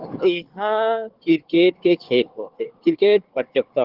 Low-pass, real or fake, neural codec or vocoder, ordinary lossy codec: 5.4 kHz; fake; codec, 16 kHz, 4 kbps, FunCodec, trained on Chinese and English, 50 frames a second; Opus, 16 kbps